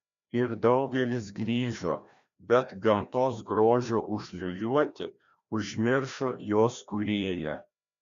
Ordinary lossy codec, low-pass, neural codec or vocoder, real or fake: MP3, 64 kbps; 7.2 kHz; codec, 16 kHz, 1 kbps, FreqCodec, larger model; fake